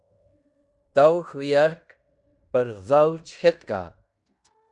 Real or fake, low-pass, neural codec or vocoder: fake; 10.8 kHz; codec, 16 kHz in and 24 kHz out, 0.9 kbps, LongCat-Audio-Codec, fine tuned four codebook decoder